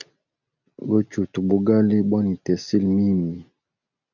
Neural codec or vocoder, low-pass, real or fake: none; 7.2 kHz; real